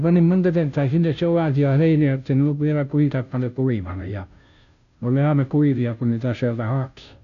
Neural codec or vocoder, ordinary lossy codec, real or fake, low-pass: codec, 16 kHz, 0.5 kbps, FunCodec, trained on Chinese and English, 25 frames a second; none; fake; 7.2 kHz